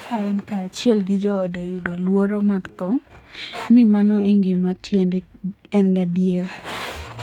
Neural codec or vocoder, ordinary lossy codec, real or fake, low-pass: codec, 44.1 kHz, 2.6 kbps, DAC; none; fake; 19.8 kHz